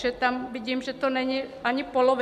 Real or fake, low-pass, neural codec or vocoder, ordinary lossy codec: real; 14.4 kHz; none; MP3, 96 kbps